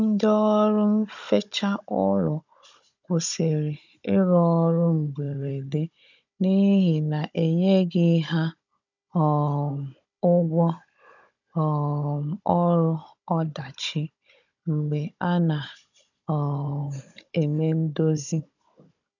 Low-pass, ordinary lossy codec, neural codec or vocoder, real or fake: 7.2 kHz; none; codec, 16 kHz, 16 kbps, FunCodec, trained on Chinese and English, 50 frames a second; fake